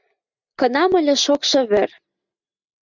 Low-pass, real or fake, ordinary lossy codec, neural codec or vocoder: 7.2 kHz; real; Opus, 64 kbps; none